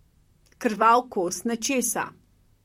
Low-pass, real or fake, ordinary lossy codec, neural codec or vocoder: 19.8 kHz; fake; MP3, 64 kbps; vocoder, 44.1 kHz, 128 mel bands, Pupu-Vocoder